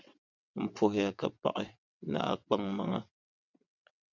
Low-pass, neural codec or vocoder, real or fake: 7.2 kHz; vocoder, 22.05 kHz, 80 mel bands, WaveNeXt; fake